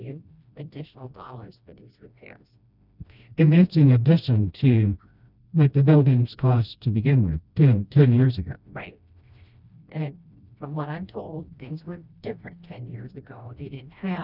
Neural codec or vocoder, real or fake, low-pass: codec, 16 kHz, 1 kbps, FreqCodec, smaller model; fake; 5.4 kHz